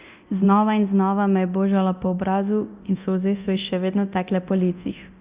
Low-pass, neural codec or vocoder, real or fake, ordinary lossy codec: 3.6 kHz; codec, 24 kHz, 0.9 kbps, DualCodec; fake; Opus, 64 kbps